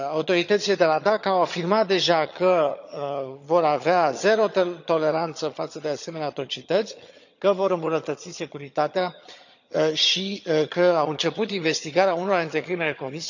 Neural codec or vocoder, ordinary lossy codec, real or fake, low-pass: vocoder, 22.05 kHz, 80 mel bands, HiFi-GAN; none; fake; 7.2 kHz